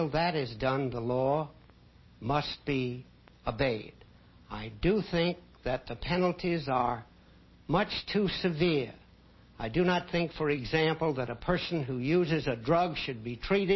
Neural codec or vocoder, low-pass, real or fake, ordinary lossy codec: none; 7.2 kHz; real; MP3, 24 kbps